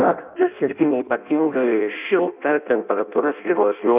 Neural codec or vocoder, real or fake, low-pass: codec, 16 kHz in and 24 kHz out, 0.6 kbps, FireRedTTS-2 codec; fake; 3.6 kHz